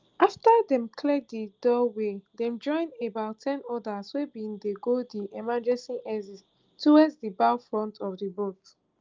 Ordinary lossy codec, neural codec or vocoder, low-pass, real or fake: Opus, 32 kbps; none; 7.2 kHz; real